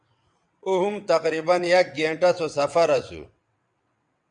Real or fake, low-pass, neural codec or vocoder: fake; 9.9 kHz; vocoder, 22.05 kHz, 80 mel bands, WaveNeXt